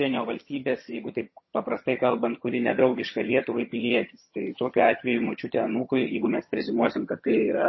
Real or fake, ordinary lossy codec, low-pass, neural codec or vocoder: fake; MP3, 24 kbps; 7.2 kHz; vocoder, 22.05 kHz, 80 mel bands, HiFi-GAN